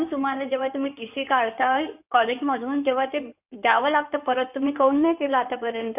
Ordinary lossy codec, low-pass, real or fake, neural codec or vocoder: none; 3.6 kHz; fake; codec, 16 kHz in and 24 kHz out, 2.2 kbps, FireRedTTS-2 codec